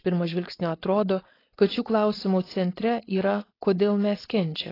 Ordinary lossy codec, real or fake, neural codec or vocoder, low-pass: AAC, 24 kbps; fake; codec, 16 kHz, 4.8 kbps, FACodec; 5.4 kHz